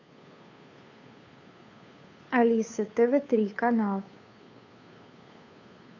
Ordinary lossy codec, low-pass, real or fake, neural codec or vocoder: AAC, 48 kbps; 7.2 kHz; fake; codec, 16 kHz, 2 kbps, FunCodec, trained on Chinese and English, 25 frames a second